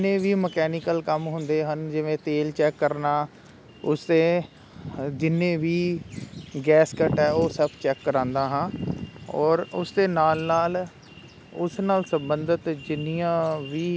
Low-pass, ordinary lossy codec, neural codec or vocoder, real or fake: none; none; none; real